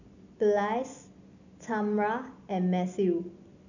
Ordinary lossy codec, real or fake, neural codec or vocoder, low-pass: none; real; none; 7.2 kHz